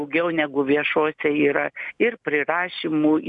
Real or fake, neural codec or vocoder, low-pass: real; none; 10.8 kHz